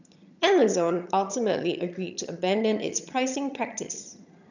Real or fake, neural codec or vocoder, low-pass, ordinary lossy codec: fake; vocoder, 22.05 kHz, 80 mel bands, HiFi-GAN; 7.2 kHz; none